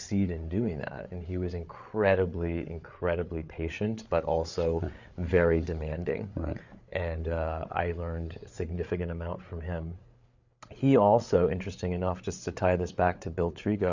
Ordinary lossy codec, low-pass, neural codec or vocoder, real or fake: Opus, 64 kbps; 7.2 kHz; codec, 16 kHz, 8 kbps, FreqCodec, larger model; fake